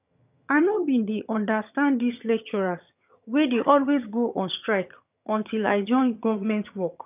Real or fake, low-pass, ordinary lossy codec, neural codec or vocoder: fake; 3.6 kHz; none; vocoder, 22.05 kHz, 80 mel bands, HiFi-GAN